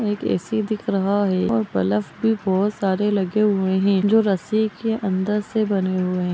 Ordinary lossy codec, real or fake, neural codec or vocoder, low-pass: none; real; none; none